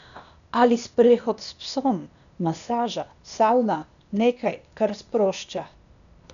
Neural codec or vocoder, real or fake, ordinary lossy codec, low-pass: codec, 16 kHz, 0.8 kbps, ZipCodec; fake; none; 7.2 kHz